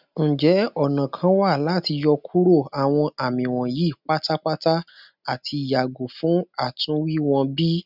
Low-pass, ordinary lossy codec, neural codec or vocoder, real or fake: 5.4 kHz; none; none; real